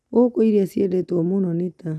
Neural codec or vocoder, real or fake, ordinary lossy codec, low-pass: none; real; none; none